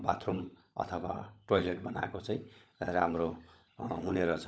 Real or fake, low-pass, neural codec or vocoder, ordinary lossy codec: fake; none; codec, 16 kHz, 16 kbps, FunCodec, trained on LibriTTS, 50 frames a second; none